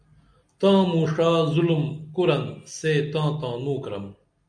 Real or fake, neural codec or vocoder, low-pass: real; none; 9.9 kHz